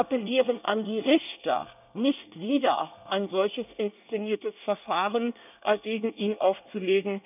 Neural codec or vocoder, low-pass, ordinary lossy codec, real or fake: codec, 24 kHz, 1 kbps, SNAC; 3.6 kHz; none; fake